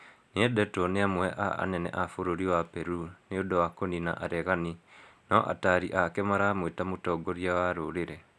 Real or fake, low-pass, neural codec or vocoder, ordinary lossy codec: real; none; none; none